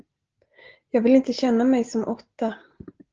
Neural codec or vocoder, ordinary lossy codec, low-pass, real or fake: none; Opus, 16 kbps; 7.2 kHz; real